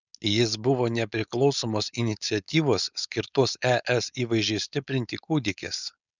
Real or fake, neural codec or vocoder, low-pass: fake; codec, 16 kHz, 4.8 kbps, FACodec; 7.2 kHz